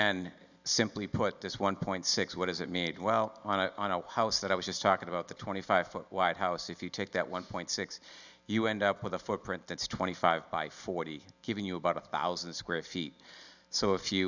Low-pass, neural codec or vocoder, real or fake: 7.2 kHz; none; real